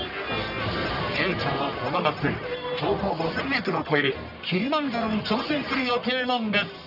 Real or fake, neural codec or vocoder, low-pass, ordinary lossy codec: fake; codec, 44.1 kHz, 1.7 kbps, Pupu-Codec; 5.4 kHz; none